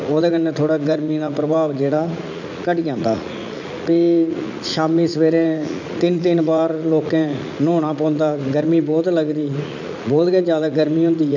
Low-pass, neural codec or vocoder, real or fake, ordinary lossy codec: 7.2 kHz; vocoder, 44.1 kHz, 80 mel bands, Vocos; fake; none